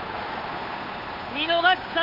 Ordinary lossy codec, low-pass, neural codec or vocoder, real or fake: Opus, 32 kbps; 5.4 kHz; codec, 16 kHz, 8 kbps, FunCodec, trained on Chinese and English, 25 frames a second; fake